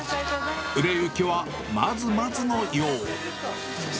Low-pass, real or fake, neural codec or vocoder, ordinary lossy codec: none; real; none; none